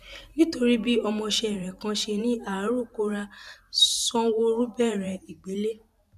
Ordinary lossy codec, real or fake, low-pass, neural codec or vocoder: none; fake; 14.4 kHz; vocoder, 44.1 kHz, 128 mel bands every 512 samples, BigVGAN v2